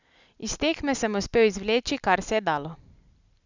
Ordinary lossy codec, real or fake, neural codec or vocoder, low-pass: none; real; none; 7.2 kHz